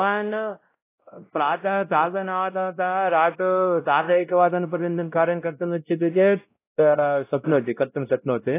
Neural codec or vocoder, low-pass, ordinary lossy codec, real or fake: codec, 16 kHz, 0.5 kbps, X-Codec, WavLM features, trained on Multilingual LibriSpeech; 3.6 kHz; AAC, 24 kbps; fake